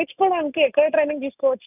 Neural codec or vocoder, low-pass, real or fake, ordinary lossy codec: none; 3.6 kHz; real; none